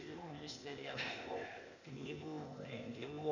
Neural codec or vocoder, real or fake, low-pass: codec, 16 kHz, 0.8 kbps, ZipCodec; fake; 7.2 kHz